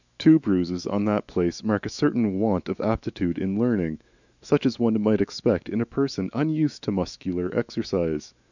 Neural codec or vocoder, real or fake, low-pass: autoencoder, 48 kHz, 128 numbers a frame, DAC-VAE, trained on Japanese speech; fake; 7.2 kHz